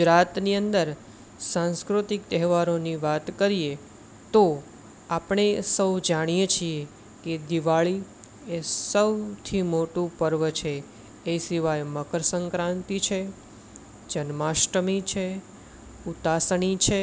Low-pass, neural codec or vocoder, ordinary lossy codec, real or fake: none; none; none; real